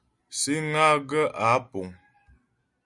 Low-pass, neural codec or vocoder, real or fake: 10.8 kHz; none; real